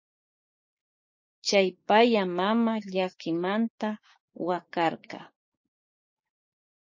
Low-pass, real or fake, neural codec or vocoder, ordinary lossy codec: 7.2 kHz; fake; codec, 16 kHz, 6 kbps, DAC; MP3, 32 kbps